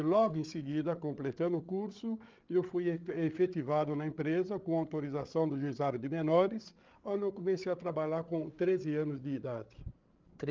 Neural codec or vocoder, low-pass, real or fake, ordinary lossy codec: codec, 16 kHz, 8 kbps, FreqCodec, larger model; 7.2 kHz; fake; Opus, 32 kbps